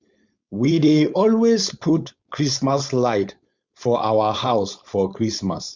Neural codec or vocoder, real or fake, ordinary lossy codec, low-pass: codec, 16 kHz, 4.8 kbps, FACodec; fake; Opus, 64 kbps; 7.2 kHz